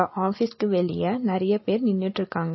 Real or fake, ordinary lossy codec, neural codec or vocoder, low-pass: real; MP3, 24 kbps; none; 7.2 kHz